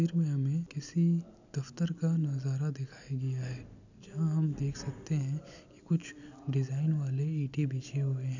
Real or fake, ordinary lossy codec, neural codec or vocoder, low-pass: real; none; none; 7.2 kHz